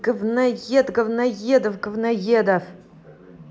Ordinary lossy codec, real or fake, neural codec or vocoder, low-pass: none; real; none; none